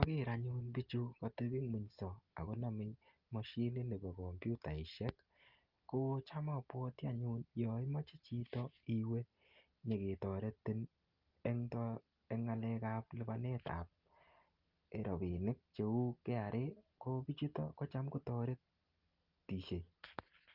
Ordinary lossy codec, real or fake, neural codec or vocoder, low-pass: Opus, 32 kbps; real; none; 5.4 kHz